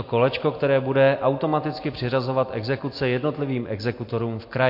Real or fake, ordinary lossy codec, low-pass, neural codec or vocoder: real; MP3, 32 kbps; 5.4 kHz; none